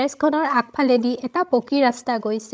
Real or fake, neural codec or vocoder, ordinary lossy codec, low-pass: fake; codec, 16 kHz, 16 kbps, FreqCodec, larger model; none; none